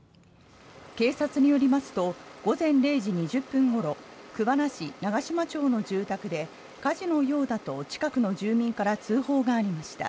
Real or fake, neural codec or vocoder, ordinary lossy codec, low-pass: real; none; none; none